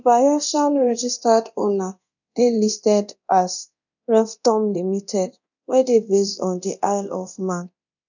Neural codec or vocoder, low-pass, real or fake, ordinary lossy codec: codec, 24 kHz, 0.9 kbps, DualCodec; 7.2 kHz; fake; none